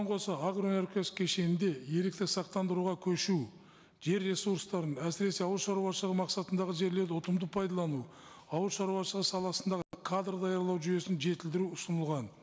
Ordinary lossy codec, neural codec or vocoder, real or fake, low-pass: none; none; real; none